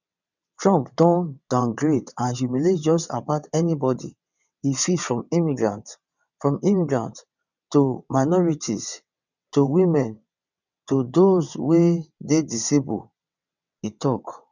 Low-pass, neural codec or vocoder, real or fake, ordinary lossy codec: 7.2 kHz; vocoder, 22.05 kHz, 80 mel bands, WaveNeXt; fake; none